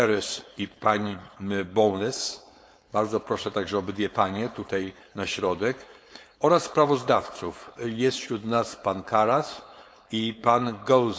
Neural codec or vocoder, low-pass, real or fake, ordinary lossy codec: codec, 16 kHz, 4.8 kbps, FACodec; none; fake; none